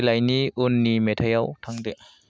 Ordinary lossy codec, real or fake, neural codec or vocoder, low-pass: none; real; none; none